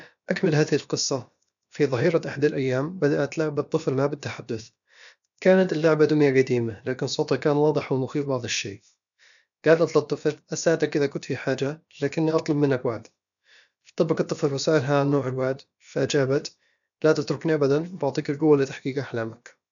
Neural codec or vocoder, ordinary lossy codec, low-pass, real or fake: codec, 16 kHz, about 1 kbps, DyCAST, with the encoder's durations; none; 7.2 kHz; fake